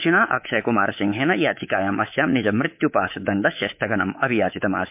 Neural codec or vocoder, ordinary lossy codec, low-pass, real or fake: codec, 24 kHz, 3.1 kbps, DualCodec; MP3, 32 kbps; 3.6 kHz; fake